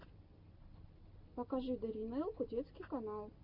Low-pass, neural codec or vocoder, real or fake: 5.4 kHz; none; real